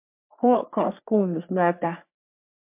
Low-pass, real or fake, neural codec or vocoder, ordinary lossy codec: 3.6 kHz; fake; codec, 44.1 kHz, 3.4 kbps, Pupu-Codec; MP3, 32 kbps